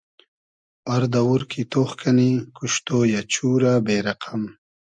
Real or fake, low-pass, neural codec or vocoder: real; 9.9 kHz; none